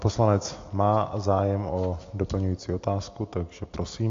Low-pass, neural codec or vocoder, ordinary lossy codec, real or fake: 7.2 kHz; none; AAC, 48 kbps; real